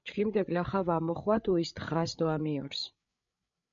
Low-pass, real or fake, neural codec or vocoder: 7.2 kHz; fake; codec, 16 kHz, 8 kbps, FreqCodec, larger model